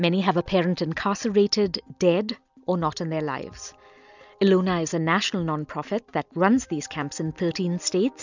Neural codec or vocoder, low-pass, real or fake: none; 7.2 kHz; real